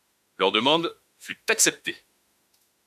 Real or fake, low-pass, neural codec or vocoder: fake; 14.4 kHz; autoencoder, 48 kHz, 32 numbers a frame, DAC-VAE, trained on Japanese speech